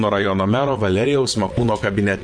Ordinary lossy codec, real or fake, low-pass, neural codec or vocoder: MP3, 48 kbps; fake; 9.9 kHz; vocoder, 22.05 kHz, 80 mel bands, WaveNeXt